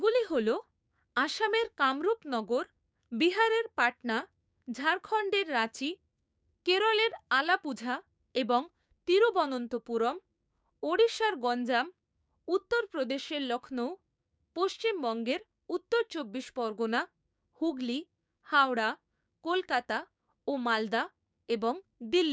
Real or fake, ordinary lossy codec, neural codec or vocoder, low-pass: fake; none; codec, 16 kHz, 6 kbps, DAC; none